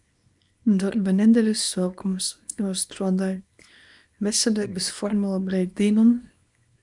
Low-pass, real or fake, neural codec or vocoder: 10.8 kHz; fake; codec, 24 kHz, 0.9 kbps, WavTokenizer, small release